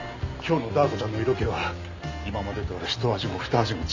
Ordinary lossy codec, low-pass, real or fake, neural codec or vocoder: MP3, 64 kbps; 7.2 kHz; real; none